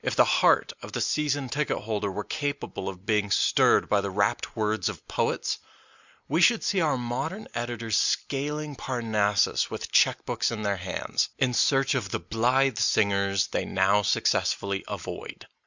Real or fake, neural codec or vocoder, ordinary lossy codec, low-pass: real; none; Opus, 64 kbps; 7.2 kHz